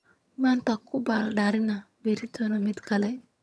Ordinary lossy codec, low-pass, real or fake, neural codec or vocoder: none; none; fake; vocoder, 22.05 kHz, 80 mel bands, HiFi-GAN